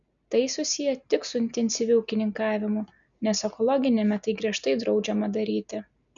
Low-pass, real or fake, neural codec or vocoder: 7.2 kHz; real; none